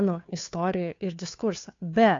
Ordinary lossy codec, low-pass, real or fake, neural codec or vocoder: AAC, 48 kbps; 7.2 kHz; fake; codec, 16 kHz, 2 kbps, FunCodec, trained on Chinese and English, 25 frames a second